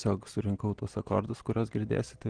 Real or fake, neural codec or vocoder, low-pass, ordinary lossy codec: real; none; 9.9 kHz; Opus, 16 kbps